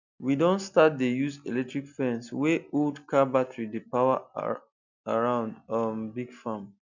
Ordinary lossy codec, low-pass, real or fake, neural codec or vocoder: none; 7.2 kHz; real; none